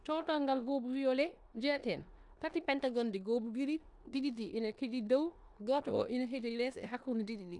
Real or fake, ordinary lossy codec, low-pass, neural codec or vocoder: fake; none; 10.8 kHz; codec, 16 kHz in and 24 kHz out, 0.9 kbps, LongCat-Audio-Codec, four codebook decoder